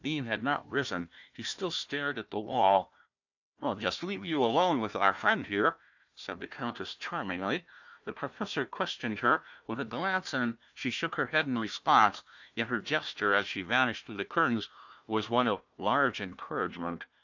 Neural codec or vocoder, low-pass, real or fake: codec, 16 kHz, 1 kbps, FunCodec, trained on Chinese and English, 50 frames a second; 7.2 kHz; fake